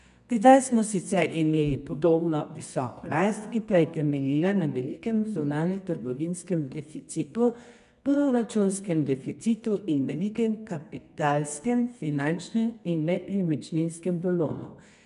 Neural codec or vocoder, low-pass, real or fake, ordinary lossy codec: codec, 24 kHz, 0.9 kbps, WavTokenizer, medium music audio release; 10.8 kHz; fake; none